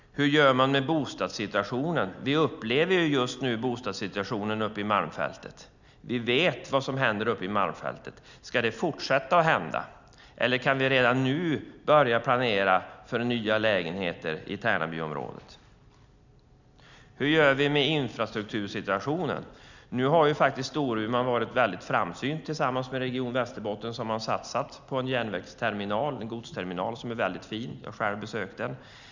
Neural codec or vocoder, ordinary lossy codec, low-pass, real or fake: none; none; 7.2 kHz; real